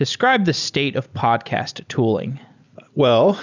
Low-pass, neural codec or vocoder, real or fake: 7.2 kHz; none; real